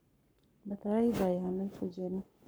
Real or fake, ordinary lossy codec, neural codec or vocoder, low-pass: fake; none; codec, 44.1 kHz, 7.8 kbps, Pupu-Codec; none